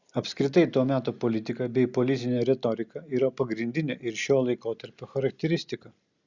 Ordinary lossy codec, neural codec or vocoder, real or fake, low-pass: Opus, 64 kbps; none; real; 7.2 kHz